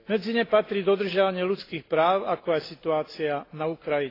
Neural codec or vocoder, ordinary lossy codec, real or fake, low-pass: none; AAC, 24 kbps; real; 5.4 kHz